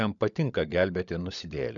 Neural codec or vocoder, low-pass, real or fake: codec, 16 kHz, 16 kbps, FunCodec, trained on LibriTTS, 50 frames a second; 7.2 kHz; fake